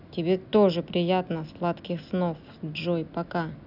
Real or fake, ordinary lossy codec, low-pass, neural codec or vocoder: real; none; 5.4 kHz; none